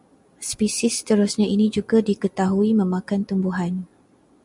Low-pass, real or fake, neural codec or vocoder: 10.8 kHz; real; none